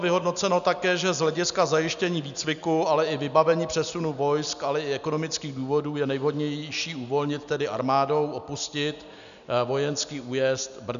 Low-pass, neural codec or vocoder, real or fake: 7.2 kHz; none; real